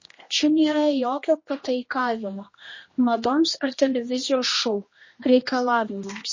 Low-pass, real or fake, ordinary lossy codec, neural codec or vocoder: 7.2 kHz; fake; MP3, 32 kbps; codec, 16 kHz, 1 kbps, X-Codec, HuBERT features, trained on general audio